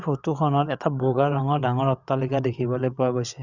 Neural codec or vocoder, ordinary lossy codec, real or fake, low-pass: vocoder, 22.05 kHz, 80 mel bands, WaveNeXt; Opus, 64 kbps; fake; 7.2 kHz